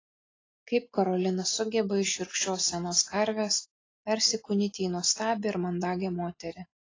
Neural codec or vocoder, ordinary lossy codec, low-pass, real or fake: none; AAC, 32 kbps; 7.2 kHz; real